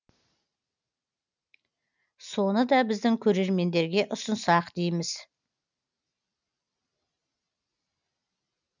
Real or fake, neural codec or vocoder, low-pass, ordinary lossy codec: real; none; 7.2 kHz; none